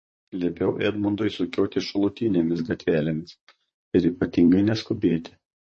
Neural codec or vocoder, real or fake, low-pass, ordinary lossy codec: vocoder, 44.1 kHz, 128 mel bands, Pupu-Vocoder; fake; 10.8 kHz; MP3, 32 kbps